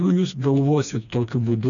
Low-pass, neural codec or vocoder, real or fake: 7.2 kHz; codec, 16 kHz, 2 kbps, FreqCodec, smaller model; fake